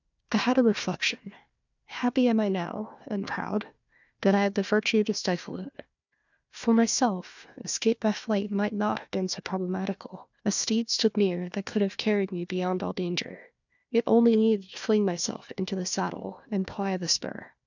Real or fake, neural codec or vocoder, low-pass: fake; codec, 16 kHz, 1 kbps, FunCodec, trained on Chinese and English, 50 frames a second; 7.2 kHz